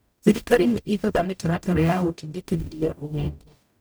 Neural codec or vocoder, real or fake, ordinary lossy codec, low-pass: codec, 44.1 kHz, 0.9 kbps, DAC; fake; none; none